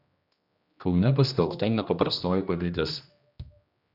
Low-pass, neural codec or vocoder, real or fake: 5.4 kHz; codec, 16 kHz, 1 kbps, X-Codec, HuBERT features, trained on general audio; fake